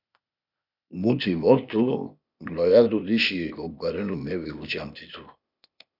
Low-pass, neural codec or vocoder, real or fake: 5.4 kHz; codec, 16 kHz, 0.8 kbps, ZipCodec; fake